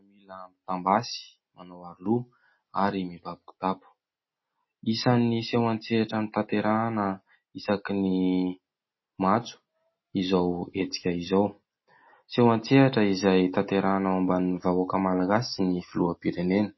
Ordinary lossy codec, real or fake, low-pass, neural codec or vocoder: MP3, 24 kbps; real; 7.2 kHz; none